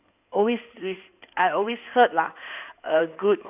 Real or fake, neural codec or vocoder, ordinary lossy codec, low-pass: fake; codec, 16 kHz in and 24 kHz out, 2.2 kbps, FireRedTTS-2 codec; none; 3.6 kHz